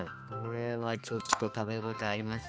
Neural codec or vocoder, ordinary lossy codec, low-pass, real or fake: codec, 16 kHz, 2 kbps, X-Codec, HuBERT features, trained on balanced general audio; none; none; fake